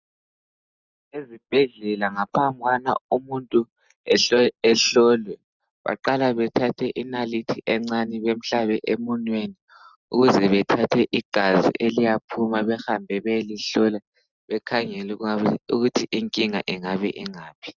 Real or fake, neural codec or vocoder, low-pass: real; none; 7.2 kHz